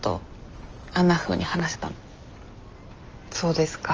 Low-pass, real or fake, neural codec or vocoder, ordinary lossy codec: 7.2 kHz; real; none; Opus, 24 kbps